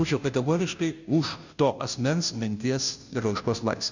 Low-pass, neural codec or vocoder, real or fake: 7.2 kHz; codec, 16 kHz, 0.5 kbps, FunCodec, trained on Chinese and English, 25 frames a second; fake